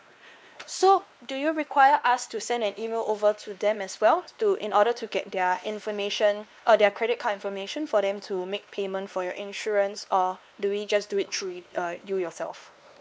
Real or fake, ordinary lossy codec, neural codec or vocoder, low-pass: fake; none; codec, 16 kHz, 2 kbps, X-Codec, WavLM features, trained on Multilingual LibriSpeech; none